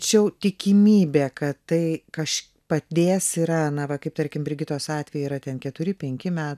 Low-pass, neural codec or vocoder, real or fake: 14.4 kHz; none; real